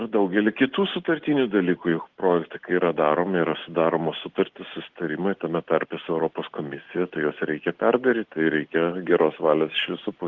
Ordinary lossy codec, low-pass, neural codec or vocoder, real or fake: Opus, 16 kbps; 7.2 kHz; none; real